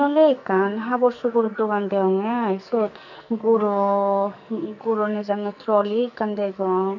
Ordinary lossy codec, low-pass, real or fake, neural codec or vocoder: none; 7.2 kHz; fake; codec, 44.1 kHz, 2.6 kbps, SNAC